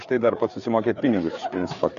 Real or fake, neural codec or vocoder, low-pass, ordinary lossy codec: fake; codec, 16 kHz, 4 kbps, FreqCodec, larger model; 7.2 kHz; Opus, 64 kbps